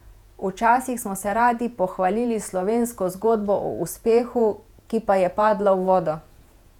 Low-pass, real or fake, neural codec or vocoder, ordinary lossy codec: 19.8 kHz; fake; vocoder, 48 kHz, 128 mel bands, Vocos; none